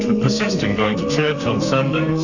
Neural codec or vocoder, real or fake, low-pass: codec, 32 kHz, 1.9 kbps, SNAC; fake; 7.2 kHz